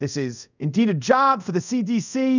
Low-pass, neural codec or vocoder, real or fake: 7.2 kHz; codec, 24 kHz, 0.5 kbps, DualCodec; fake